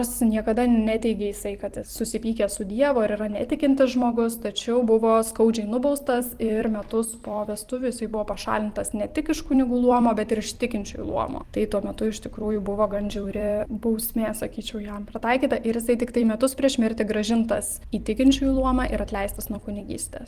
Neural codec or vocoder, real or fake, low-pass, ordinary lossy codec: vocoder, 44.1 kHz, 128 mel bands every 256 samples, BigVGAN v2; fake; 14.4 kHz; Opus, 24 kbps